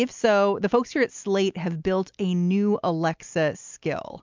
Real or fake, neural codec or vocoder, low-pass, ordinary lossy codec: real; none; 7.2 kHz; MP3, 64 kbps